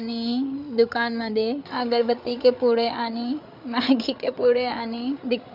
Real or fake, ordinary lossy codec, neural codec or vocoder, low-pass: fake; none; codec, 16 kHz, 8 kbps, FreqCodec, larger model; 5.4 kHz